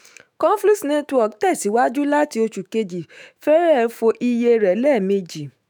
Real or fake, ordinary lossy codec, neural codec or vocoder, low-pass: fake; none; autoencoder, 48 kHz, 128 numbers a frame, DAC-VAE, trained on Japanese speech; none